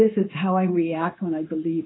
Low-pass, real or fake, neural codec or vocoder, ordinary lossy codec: 7.2 kHz; fake; codec, 16 kHz, 0.9 kbps, LongCat-Audio-Codec; AAC, 16 kbps